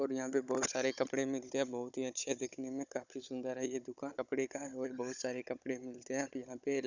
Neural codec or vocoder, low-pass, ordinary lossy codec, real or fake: codec, 16 kHz, 16 kbps, FunCodec, trained on Chinese and English, 50 frames a second; 7.2 kHz; none; fake